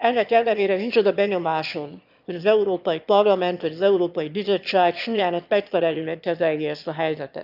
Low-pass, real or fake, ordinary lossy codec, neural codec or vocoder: 5.4 kHz; fake; none; autoencoder, 22.05 kHz, a latent of 192 numbers a frame, VITS, trained on one speaker